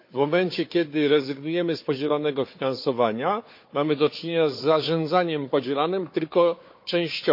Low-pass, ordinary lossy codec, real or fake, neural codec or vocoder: 5.4 kHz; MP3, 32 kbps; fake; codec, 16 kHz, 4 kbps, FunCodec, trained on Chinese and English, 50 frames a second